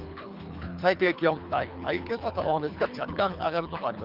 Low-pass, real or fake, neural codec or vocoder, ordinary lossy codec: 5.4 kHz; fake; codec, 24 kHz, 3 kbps, HILCodec; Opus, 24 kbps